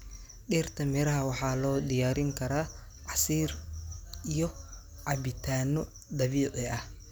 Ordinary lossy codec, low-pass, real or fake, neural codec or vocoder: none; none; real; none